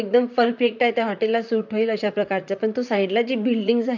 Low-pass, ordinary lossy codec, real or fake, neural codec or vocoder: 7.2 kHz; none; fake; vocoder, 44.1 kHz, 128 mel bands, Pupu-Vocoder